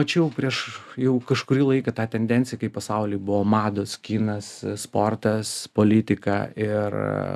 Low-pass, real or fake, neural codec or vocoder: 14.4 kHz; real; none